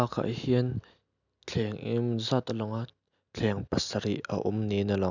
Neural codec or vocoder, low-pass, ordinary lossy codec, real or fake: none; 7.2 kHz; none; real